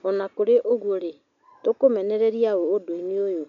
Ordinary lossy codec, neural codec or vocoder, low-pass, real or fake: none; none; 7.2 kHz; real